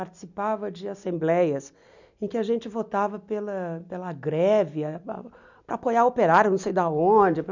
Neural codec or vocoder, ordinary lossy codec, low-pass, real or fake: none; none; 7.2 kHz; real